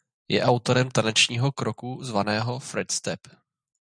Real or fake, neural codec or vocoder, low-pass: real; none; 9.9 kHz